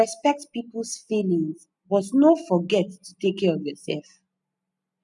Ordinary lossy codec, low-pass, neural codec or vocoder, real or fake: none; 10.8 kHz; none; real